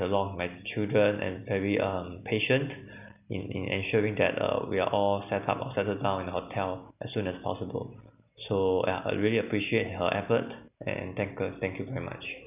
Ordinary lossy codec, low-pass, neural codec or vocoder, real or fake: none; 3.6 kHz; none; real